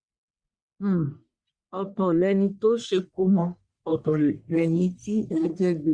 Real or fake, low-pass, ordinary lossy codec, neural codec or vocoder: fake; 9.9 kHz; Opus, 64 kbps; codec, 44.1 kHz, 1.7 kbps, Pupu-Codec